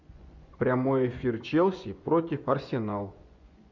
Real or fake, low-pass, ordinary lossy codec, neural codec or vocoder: fake; 7.2 kHz; MP3, 64 kbps; vocoder, 24 kHz, 100 mel bands, Vocos